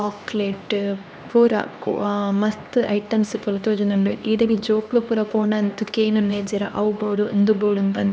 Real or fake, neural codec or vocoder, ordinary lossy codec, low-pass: fake; codec, 16 kHz, 2 kbps, X-Codec, HuBERT features, trained on LibriSpeech; none; none